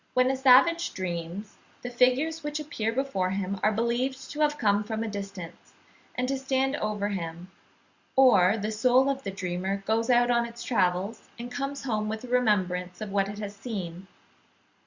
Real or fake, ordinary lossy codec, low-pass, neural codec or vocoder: real; Opus, 64 kbps; 7.2 kHz; none